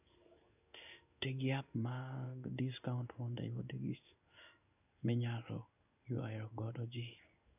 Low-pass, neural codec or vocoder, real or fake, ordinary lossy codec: 3.6 kHz; codec, 16 kHz in and 24 kHz out, 1 kbps, XY-Tokenizer; fake; none